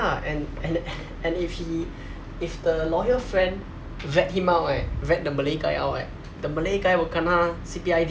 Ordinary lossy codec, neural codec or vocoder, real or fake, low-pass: none; none; real; none